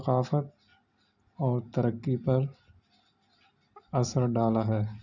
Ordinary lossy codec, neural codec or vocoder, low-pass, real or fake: none; none; 7.2 kHz; real